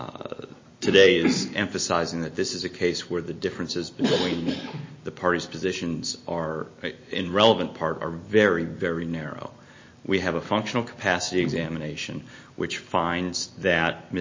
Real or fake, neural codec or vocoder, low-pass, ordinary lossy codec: real; none; 7.2 kHz; MP3, 32 kbps